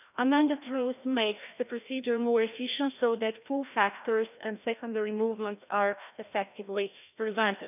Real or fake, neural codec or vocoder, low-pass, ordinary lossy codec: fake; codec, 16 kHz, 1 kbps, FreqCodec, larger model; 3.6 kHz; none